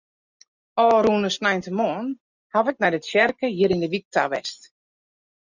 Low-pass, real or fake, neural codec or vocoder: 7.2 kHz; real; none